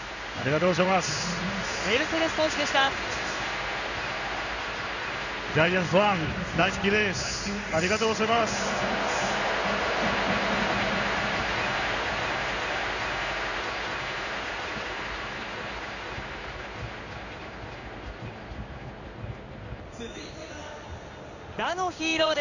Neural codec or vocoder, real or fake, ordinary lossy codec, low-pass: codec, 16 kHz in and 24 kHz out, 1 kbps, XY-Tokenizer; fake; none; 7.2 kHz